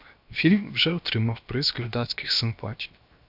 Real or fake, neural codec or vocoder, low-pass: fake; codec, 16 kHz, 0.7 kbps, FocalCodec; 5.4 kHz